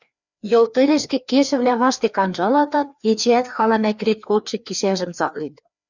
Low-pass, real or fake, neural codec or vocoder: 7.2 kHz; fake; codec, 16 kHz, 2 kbps, FreqCodec, larger model